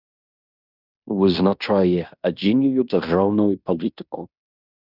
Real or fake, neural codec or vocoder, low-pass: fake; codec, 16 kHz in and 24 kHz out, 0.9 kbps, LongCat-Audio-Codec, fine tuned four codebook decoder; 5.4 kHz